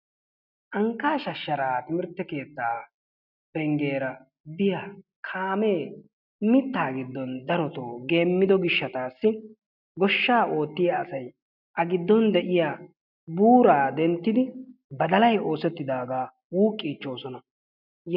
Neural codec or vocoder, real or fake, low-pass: none; real; 5.4 kHz